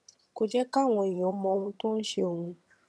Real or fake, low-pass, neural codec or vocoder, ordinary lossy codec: fake; none; vocoder, 22.05 kHz, 80 mel bands, HiFi-GAN; none